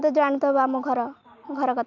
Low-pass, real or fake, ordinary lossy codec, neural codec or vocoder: 7.2 kHz; real; none; none